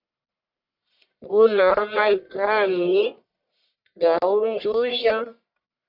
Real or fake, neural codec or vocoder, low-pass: fake; codec, 44.1 kHz, 1.7 kbps, Pupu-Codec; 5.4 kHz